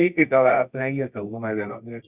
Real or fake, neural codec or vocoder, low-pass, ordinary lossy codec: fake; codec, 24 kHz, 0.9 kbps, WavTokenizer, medium music audio release; 3.6 kHz; Opus, 64 kbps